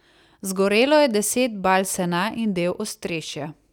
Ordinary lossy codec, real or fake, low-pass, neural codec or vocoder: none; real; 19.8 kHz; none